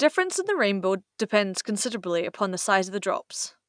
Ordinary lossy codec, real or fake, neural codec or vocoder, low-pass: none; real; none; 9.9 kHz